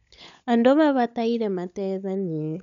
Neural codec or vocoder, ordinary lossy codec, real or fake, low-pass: codec, 16 kHz, 16 kbps, FunCodec, trained on LibriTTS, 50 frames a second; none; fake; 7.2 kHz